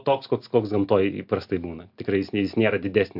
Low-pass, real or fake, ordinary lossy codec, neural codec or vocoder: 5.4 kHz; real; MP3, 48 kbps; none